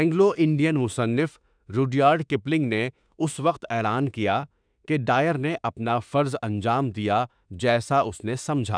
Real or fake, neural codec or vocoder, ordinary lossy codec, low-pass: fake; autoencoder, 48 kHz, 32 numbers a frame, DAC-VAE, trained on Japanese speech; none; 9.9 kHz